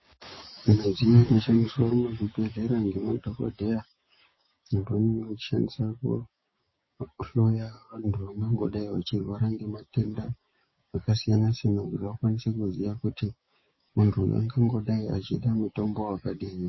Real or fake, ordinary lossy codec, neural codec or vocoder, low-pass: fake; MP3, 24 kbps; codec, 16 kHz, 6 kbps, DAC; 7.2 kHz